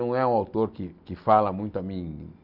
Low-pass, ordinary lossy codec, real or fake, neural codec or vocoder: 5.4 kHz; none; real; none